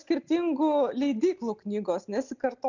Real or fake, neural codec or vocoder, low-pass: real; none; 7.2 kHz